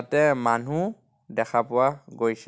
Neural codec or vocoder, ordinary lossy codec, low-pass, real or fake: none; none; none; real